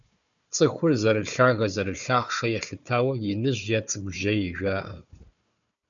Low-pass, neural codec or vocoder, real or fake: 7.2 kHz; codec, 16 kHz, 4 kbps, FunCodec, trained on Chinese and English, 50 frames a second; fake